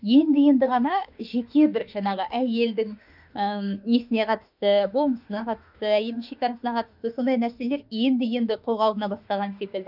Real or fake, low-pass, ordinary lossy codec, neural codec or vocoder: fake; 5.4 kHz; none; autoencoder, 48 kHz, 32 numbers a frame, DAC-VAE, trained on Japanese speech